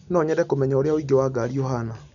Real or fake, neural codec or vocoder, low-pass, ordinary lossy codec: real; none; 7.2 kHz; none